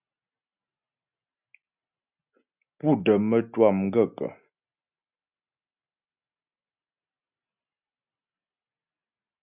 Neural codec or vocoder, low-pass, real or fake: none; 3.6 kHz; real